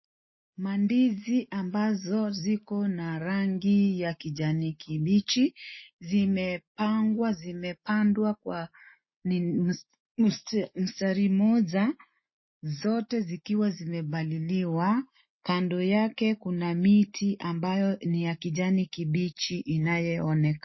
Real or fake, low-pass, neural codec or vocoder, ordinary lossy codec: real; 7.2 kHz; none; MP3, 24 kbps